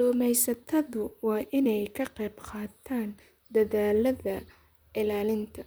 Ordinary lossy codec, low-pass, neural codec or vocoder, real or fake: none; none; vocoder, 44.1 kHz, 128 mel bands, Pupu-Vocoder; fake